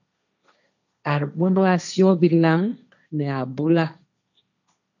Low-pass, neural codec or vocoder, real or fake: 7.2 kHz; codec, 16 kHz, 1.1 kbps, Voila-Tokenizer; fake